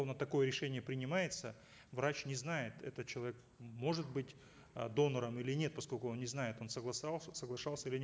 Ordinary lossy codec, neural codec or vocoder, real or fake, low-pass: none; none; real; none